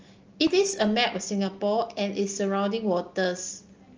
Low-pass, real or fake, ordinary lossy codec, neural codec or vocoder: 7.2 kHz; real; Opus, 24 kbps; none